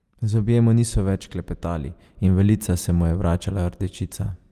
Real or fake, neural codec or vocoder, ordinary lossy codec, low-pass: real; none; Opus, 32 kbps; 14.4 kHz